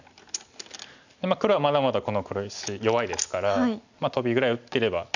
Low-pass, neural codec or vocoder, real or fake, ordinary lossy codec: 7.2 kHz; none; real; none